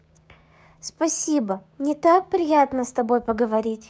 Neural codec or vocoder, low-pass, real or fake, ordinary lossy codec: codec, 16 kHz, 6 kbps, DAC; none; fake; none